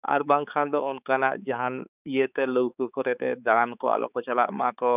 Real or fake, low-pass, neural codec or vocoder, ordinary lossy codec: fake; 3.6 kHz; codec, 16 kHz, 4 kbps, X-Codec, HuBERT features, trained on balanced general audio; none